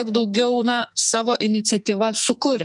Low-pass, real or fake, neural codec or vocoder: 10.8 kHz; fake; codec, 44.1 kHz, 2.6 kbps, SNAC